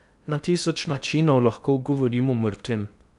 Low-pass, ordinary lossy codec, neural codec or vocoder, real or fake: 10.8 kHz; none; codec, 16 kHz in and 24 kHz out, 0.8 kbps, FocalCodec, streaming, 65536 codes; fake